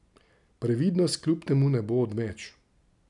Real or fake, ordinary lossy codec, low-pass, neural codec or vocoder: real; none; 10.8 kHz; none